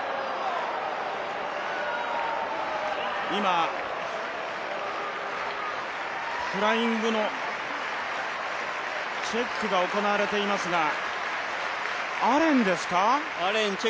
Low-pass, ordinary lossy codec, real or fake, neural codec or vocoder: none; none; real; none